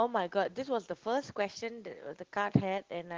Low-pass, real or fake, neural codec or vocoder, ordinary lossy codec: 7.2 kHz; real; none; Opus, 16 kbps